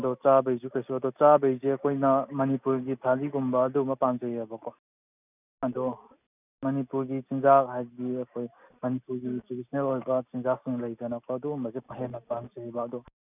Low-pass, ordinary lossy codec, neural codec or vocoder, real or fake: 3.6 kHz; none; none; real